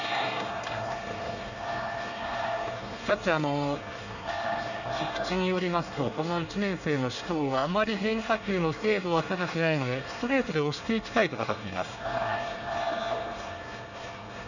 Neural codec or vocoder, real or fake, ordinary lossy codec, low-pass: codec, 24 kHz, 1 kbps, SNAC; fake; none; 7.2 kHz